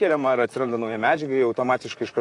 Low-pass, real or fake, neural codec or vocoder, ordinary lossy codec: 10.8 kHz; fake; vocoder, 44.1 kHz, 128 mel bands, Pupu-Vocoder; AAC, 48 kbps